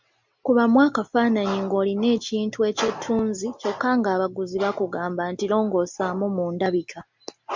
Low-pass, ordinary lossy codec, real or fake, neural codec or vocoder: 7.2 kHz; MP3, 64 kbps; real; none